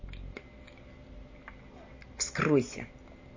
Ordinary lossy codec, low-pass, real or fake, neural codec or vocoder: MP3, 32 kbps; 7.2 kHz; real; none